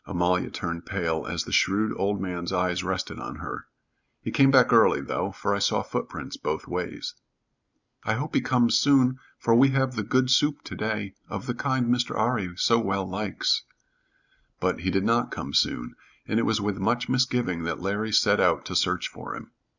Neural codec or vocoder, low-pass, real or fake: none; 7.2 kHz; real